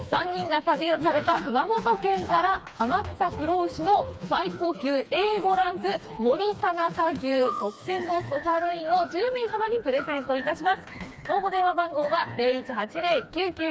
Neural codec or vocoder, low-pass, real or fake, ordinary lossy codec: codec, 16 kHz, 2 kbps, FreqCodec, smaller model; none; fake; none